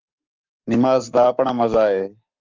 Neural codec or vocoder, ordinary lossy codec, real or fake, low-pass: codec, 44.1 kHz, 7.8 kbps, Pupu-Codec; Opus, 24 kbps; fake; 7.2 kHz